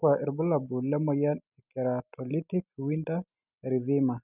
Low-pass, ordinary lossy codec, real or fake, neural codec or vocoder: 3.6 kHz; none; real; none